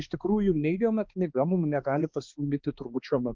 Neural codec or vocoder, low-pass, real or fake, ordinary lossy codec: codec, 16 kHz, 2 kbps, X-Codec, HuBERT features, trained on balanced general audio; 7.2 kHz; fake; Opus, 24 kbps